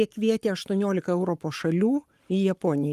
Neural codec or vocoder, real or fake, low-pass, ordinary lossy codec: codec, 44.1 kHz, 7.8 kbps, Pupu-Codec; fake; 14.4 kHz; Opus, 32 kbps